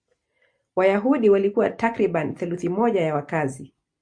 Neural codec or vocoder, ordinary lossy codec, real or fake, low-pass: none; Opus, 64 kbps; real; 9.9 kHz